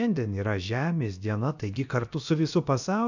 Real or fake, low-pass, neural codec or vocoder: fake; 7.2 kHz; codec, 16 kHz, about 1 kbps, DyCAST, with the encoder's durations